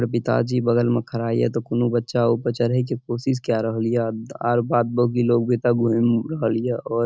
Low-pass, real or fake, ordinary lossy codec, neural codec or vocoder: none; real; none; none